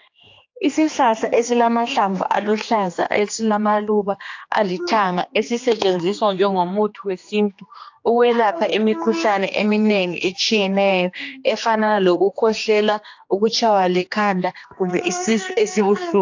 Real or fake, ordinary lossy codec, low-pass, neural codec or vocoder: fake; AAC, 48 kbps; 7.2 kHz; codec, 16 kHz, 2 kbps, X-Codec, HuBERT features, trained on general audio